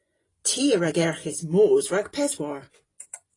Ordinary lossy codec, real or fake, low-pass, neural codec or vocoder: AAC, 32 kbps; fake; 10.8 kHz; vocoder, 24 kHz, 100 mel bands, Vocos